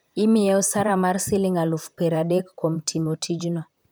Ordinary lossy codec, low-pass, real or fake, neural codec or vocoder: none; none; fake; vocoder, 44.1 kHz, 128 mel bands, Pupu-Vocoder